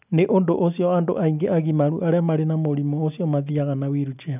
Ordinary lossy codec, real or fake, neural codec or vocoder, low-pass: none; real; none; 3.6 kHz